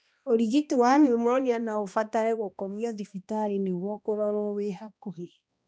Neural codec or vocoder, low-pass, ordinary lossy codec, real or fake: codec, 16 kHz, 1 kbps, X-Codec, HuBERT features, trained on balanced general audio; none; none; fake